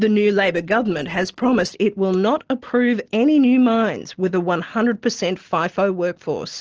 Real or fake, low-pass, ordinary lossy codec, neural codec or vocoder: real; 7.2 kHz; Opus, 16 kbps; none